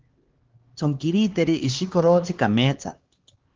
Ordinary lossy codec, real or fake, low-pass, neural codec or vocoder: Opus, 16 kbps; fake; 7.2 kHz; codec, 16 kHz, 2 kbps, X-Codec, HuBERT features, trained on LibriSpeech